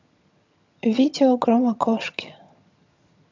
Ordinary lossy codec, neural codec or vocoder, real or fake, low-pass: MP3, 64 kbps; vocoder, 22.05 kHz, 80 mel bands, HiFi-GAN; fake; 7.2 kHz